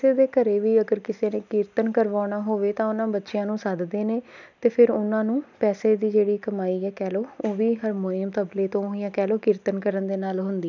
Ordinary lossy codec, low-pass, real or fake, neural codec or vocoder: none; 7.2 kHz; real; none